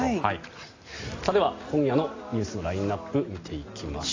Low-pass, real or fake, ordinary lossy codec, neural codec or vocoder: 7.2 kHz; real; AAC, 32 kbps; none